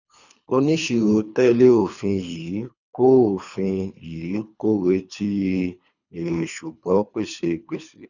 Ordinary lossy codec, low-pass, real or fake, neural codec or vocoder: none; 7.2 kHz; fake; codec, 24 kHz, 3 kbps, HILCodec